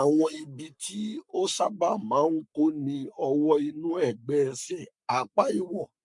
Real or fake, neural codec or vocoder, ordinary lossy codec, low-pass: fake; codec, 44.1 kHz, 7.8 kbps, Pupu-Codec; MP3, 64 kbps; 10.8 kHz